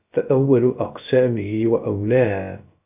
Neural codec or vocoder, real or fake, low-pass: codec, 16 kHz, 0.3 kbps, FocalCodec; fake; 3.6 kHz